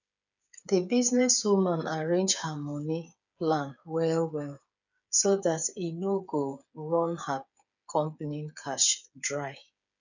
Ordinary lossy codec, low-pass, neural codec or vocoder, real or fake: none; 7.2 kHz; codec, 16 kHz, 8 kbps, FreqCodec, smaller model; fake